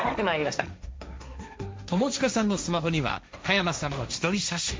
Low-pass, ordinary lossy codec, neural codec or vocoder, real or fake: none; none; codec, 16 kHz, 1.1 kbps, Voila-Tokenizer; fake